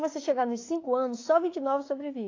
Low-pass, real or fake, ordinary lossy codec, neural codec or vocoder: 7.2 kHz; fake; AAC, 32 kbps; autoencoder, 48 kHz, 32 numbers a frame, DAC-VAE, trained on Japanese speech